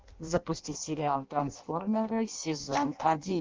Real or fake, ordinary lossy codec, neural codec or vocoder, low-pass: fake; Opus, 32 kbps; codec, 16 kHz in and 24 kHz out, 0.6 kbps, FireRedTTS-2 codec; 7.2 kHz